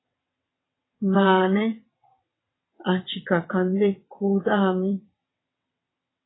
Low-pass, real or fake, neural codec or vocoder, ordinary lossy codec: 7.2 kHz; fake; vocoder, 22.05 kHz, 80 mel bands, WaveNeXt; AAC, 16 kbps